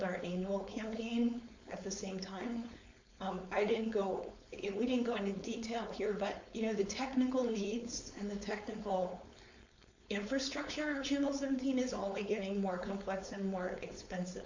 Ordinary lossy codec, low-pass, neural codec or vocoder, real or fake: MP3, 48 kbps; 7.2 kHz; codec, 16 kHz, 4.8 kbps, FACodec; fake